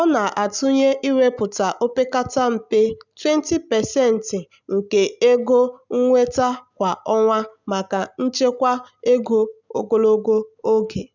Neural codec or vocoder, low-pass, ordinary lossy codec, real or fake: none; 7.2 kHz; none; real